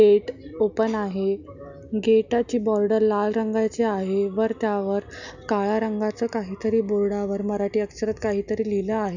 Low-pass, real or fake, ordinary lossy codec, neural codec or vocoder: 7.2 kHz; real; none; none